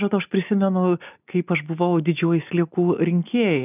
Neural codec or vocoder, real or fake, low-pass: none; real; 3.6 kHz